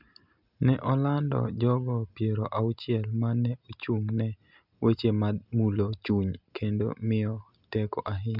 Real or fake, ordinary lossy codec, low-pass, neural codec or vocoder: real; none; 5.4 kHz; none